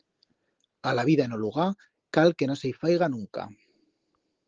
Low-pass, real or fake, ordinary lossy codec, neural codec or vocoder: 7.2 kHz; real; Opus, 16 kbps; none